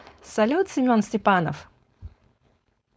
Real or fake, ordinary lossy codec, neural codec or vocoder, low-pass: fake; none; codec, 16 kHz, 4.8 kbps, FACodec; none